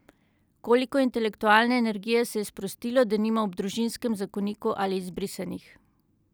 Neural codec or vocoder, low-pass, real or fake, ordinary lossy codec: none; none; real; none